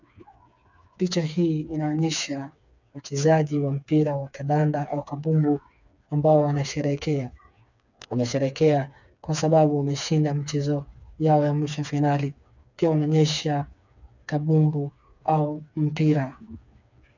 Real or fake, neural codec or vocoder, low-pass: fake; codec, 16 kHz, 4 kbps, FreqCodec, smaller model; 7.2 kHz